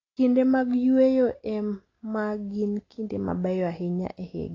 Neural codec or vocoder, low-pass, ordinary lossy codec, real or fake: none; 7.2 kHz; AAC, 32 kbps; real